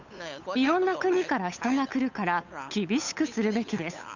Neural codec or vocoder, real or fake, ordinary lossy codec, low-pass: codec, 16 kHz, 8 kbps, FunCodec, trained on Chinese and English, 25 frames a second; fake; none; 7.2 kHz